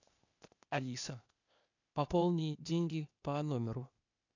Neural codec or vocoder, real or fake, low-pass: codec, 16 kHz, 0.8 kbps, ZipCodec; fake; 7.2 kHz